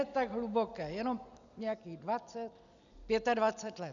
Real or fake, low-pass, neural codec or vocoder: real; 7.2 kHz; none